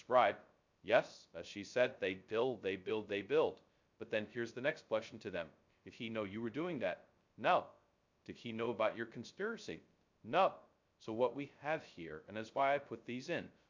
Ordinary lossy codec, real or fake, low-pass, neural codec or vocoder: MP3, 64 kbps; fake; 7.2 kHz; codec, 16 kHz, 0.2 kbps, FocalCodec